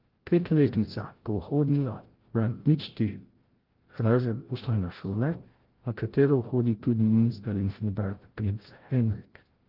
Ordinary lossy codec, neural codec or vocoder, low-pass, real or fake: Opus, 16 kbps; codec, 16 kHz, 0.5 kbps, FreqCodec, larger model; 5.4 kHz; fake